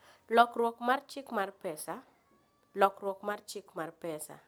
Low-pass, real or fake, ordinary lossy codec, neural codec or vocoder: none; real; none; none